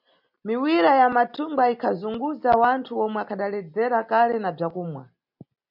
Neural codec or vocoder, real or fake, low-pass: none; real; 5.4 kHz